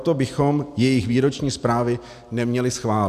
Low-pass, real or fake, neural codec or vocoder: 14.4 kHz; real; none